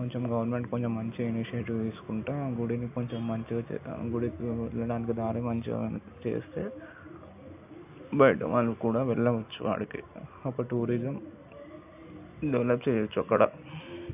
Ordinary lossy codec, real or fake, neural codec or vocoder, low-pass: AAC, 32 kbps; real; none; 3.6 kHz